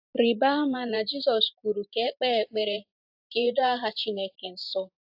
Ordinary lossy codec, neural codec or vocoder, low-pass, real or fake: AAC, 48 kbps; vocoder, 44.1 kHz, 128 mel bands every 512 samples, BigVGAN v2; 5.4 kHz; fake